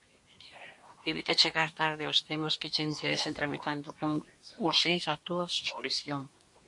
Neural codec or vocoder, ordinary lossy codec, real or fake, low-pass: codec, 24 kHz, 1 kbps, SNAC; MP3, 48 kbps; fake; 10.8 kHz